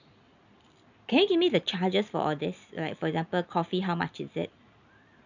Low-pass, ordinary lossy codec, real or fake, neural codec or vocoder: 7.2 kHz; none; real; none